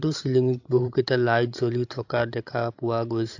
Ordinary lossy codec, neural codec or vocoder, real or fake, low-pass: AAC, 48 kbps; codec, 16 kHz, 16 kbps, FreqCodec, larger model; fake; 7.2 kHz